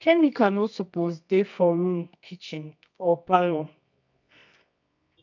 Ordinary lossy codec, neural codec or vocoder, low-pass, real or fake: none; codec, 24 kHz, 0.9 kbps, WavTokenizer, medium music audio release; 7.2 kHz; fake